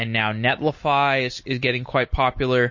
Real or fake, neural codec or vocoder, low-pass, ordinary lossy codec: real; none; 7.2 kHz; MP3, 32 kbps